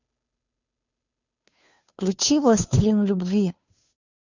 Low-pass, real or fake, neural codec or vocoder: 7.2 kHz; fake; codec, 16 kHz, 2 kbps, FunCodec, trained on Chinese and English, 25 frames a second